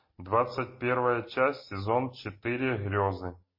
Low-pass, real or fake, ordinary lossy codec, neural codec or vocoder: 5.4 kHz; real; MP3, 24 kbps; none